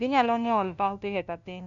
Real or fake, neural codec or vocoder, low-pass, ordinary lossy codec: fake; codec, 16 kHz, 0.5 kbps, FunCodec, trained on LibriTTS, 25 frames a second; 7.2 kHz; none